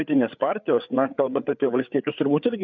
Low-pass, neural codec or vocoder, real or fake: 7.2 kHz; codec, 16 kHz, 8 kbps, FreqCodec, larger model; fake